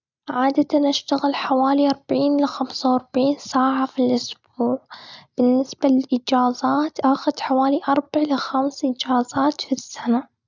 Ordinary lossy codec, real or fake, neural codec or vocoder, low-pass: none; real; none; 7.2 kHz